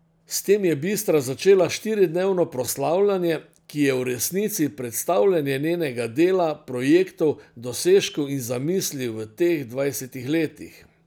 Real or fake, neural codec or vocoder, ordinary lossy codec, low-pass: real; none; none; none